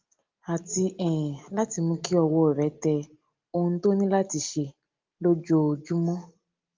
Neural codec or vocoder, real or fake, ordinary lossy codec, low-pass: none; real; Opus, 32 kbps; 7.2 kHz